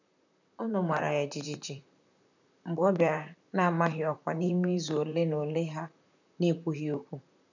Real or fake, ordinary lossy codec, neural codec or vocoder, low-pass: fake; none; vocoder, 44.1 kHz, 128 mel bands, Pupu-Vocoder; 7.2 kHz